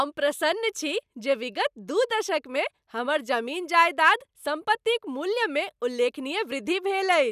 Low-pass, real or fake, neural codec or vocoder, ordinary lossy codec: 14.4 kHz; real; none; none